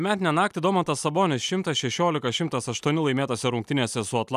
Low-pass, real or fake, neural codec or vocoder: 14.4 kHz; real; none